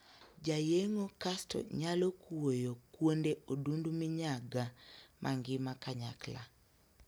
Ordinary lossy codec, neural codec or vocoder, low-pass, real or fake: none; none; none; real